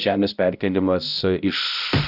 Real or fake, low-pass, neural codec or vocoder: fake; 5.4 kHz; codec, 16 kHz, 0.5 kbps, X-Codec, HuBERT features, trained on balanced general audio